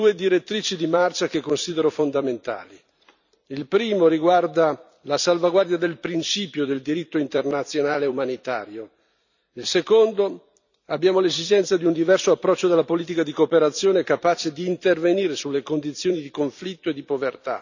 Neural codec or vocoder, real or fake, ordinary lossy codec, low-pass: vocoder, 44.1 kHz, 128 mel bands every 256 samples, BigVGAN v2; fake; none; 7.2 kHz